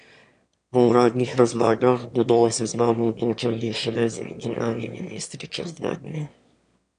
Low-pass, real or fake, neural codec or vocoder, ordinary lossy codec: 9.9 kHz; fake; autoencoder, 22.05 kHz, a latent of 192 numbers a frame, VITS, trained on one speaker; none